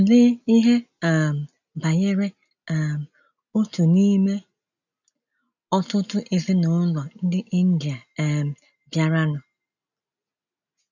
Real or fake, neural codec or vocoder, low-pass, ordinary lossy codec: real; none; 7.2 kHz; none